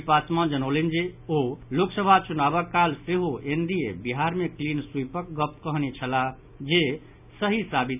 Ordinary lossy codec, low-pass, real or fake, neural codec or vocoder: none; 3.6 kHz; real; none